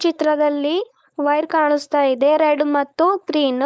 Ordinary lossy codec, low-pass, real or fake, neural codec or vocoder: none; none; fake; codec, 16 kHz, 4.8 kbps, FACodec